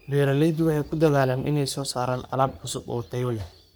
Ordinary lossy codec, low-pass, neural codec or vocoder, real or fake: none; none; codec, 44.1 kHz, 3.4 kbps, Pupu-Codec; fake